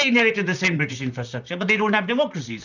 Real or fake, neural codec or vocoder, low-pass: fake; vocoder, 44.1 kHz, 128 mel bands, Pupu-Vocoder; 7.2 kHz